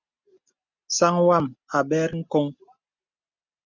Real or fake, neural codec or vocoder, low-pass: real; none; 7.2 kHz